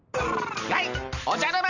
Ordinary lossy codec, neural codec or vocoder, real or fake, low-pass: none; none; real; 7.2 kHz